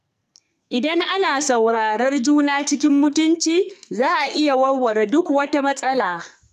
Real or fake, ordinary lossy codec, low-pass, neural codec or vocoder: fake; none; 14.4 kHz; codec, 44.1 kHz, 2.6 kbps, SNAC